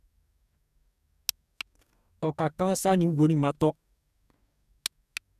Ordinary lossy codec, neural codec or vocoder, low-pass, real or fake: none; codec, 44.1 kHz, 2.6 kbps, DAC; 14.4 kHz; fake